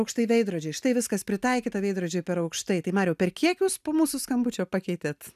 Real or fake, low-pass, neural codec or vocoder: real; 14.4 kHz; none